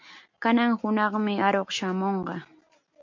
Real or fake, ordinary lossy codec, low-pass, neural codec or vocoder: real; MP3, 48 kbps; 7.2 kHz; none